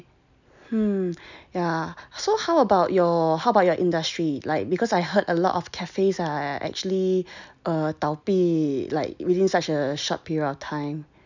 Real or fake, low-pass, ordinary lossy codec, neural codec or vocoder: real; 7.2 kHz; none; none